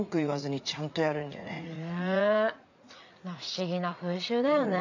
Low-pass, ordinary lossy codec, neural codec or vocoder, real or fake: 7.2 kHz; none; vocoder, 44.1 kHz, 80 mel bands, Vocos; fake